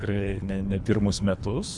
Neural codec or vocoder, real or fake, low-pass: codec, 24 kHz, 3 kbps, HILCodec; fake; 10.8 kHz